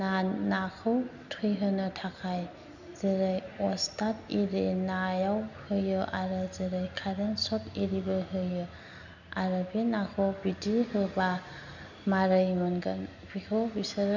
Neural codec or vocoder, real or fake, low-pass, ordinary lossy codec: none; real; 7.2 kHz; none